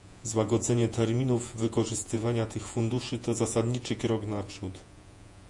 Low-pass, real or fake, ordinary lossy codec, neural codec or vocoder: 10.8 kHz; fake; AAC, 64 kbps; vocoder, 48 kHz, 128 mel bands, Vocos